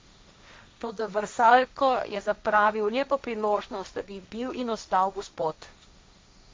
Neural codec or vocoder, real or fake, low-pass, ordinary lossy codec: codec, 16 kHz, 1.1 kbps, Voila-Tokenizer; fake; none; none